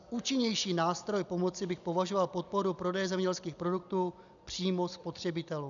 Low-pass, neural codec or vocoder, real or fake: 7.2 kHz; none; real